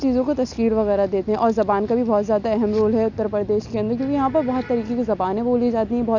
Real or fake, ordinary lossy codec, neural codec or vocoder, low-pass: real; none; none; 7.2 kHz